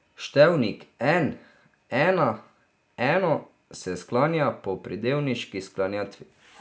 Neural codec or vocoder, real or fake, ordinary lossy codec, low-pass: none; real; none; none